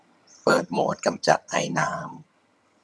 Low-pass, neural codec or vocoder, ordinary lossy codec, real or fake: none; vocoder, 22.05 kHz, 80 mel bands, HiFi-GAN; none; fake